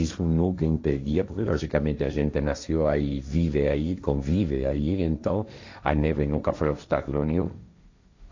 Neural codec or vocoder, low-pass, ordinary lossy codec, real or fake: codec, 16 kHz, 1.1 kbps, Voila-Tokenizer; 7.2 kHz; AAC, 48 kbps; fake